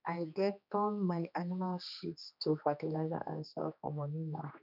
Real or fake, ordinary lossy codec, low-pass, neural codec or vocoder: fake; none; 5.4 kHz; codec, 16 kHz, 2 kbps, X-Codec, HuBERT features, trained on general audio